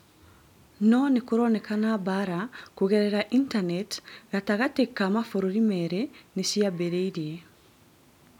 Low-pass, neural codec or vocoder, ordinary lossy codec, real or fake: 19.8 kHz; none; none; real